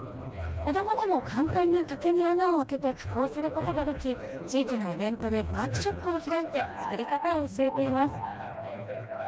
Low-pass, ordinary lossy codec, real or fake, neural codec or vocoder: none; none; fake; codec, 16 kHz, 1 kbps, FreqCodec, smaller model